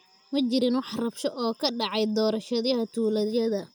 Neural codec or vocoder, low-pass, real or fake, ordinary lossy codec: none; none; real; none